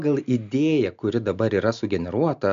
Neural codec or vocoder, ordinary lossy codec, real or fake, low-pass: none; MP3, 64 kbps; real; 7.2 kHz